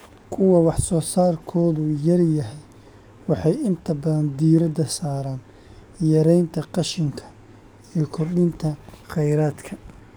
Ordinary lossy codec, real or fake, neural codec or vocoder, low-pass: none; fake; codec, 44.1 kHz, 7.8 kbps, DAC; none